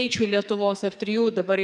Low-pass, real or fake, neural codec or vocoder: 10.8 kHz; fake; codec, 44.1 kHz, 2.6 kbps, SNAC